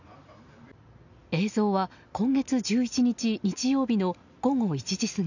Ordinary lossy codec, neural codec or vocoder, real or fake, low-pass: none; none; real; 7.2 kHz